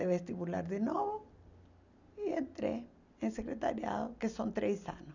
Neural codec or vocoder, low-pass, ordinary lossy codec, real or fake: none; 7.2 kHz; none; real